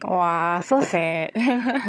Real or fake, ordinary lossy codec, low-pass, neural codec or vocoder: fake; none; none; vocoder, 22.05 kHz, 80 mel bands, HiFi-GAN